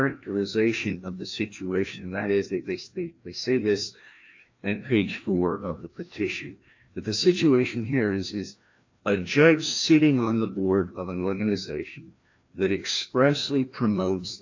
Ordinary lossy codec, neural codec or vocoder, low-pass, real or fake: AAC, 48 kbps; codec, 16 kHz, 1 kbps, FreqCodec, larger model; 7.2 kHz; fake